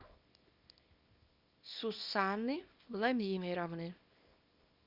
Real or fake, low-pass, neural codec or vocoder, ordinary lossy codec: fake; 5.4 kHz; codec, 24 kHz, 0.9 kbps, WavTokenizer, small release; Opus, 64 kbps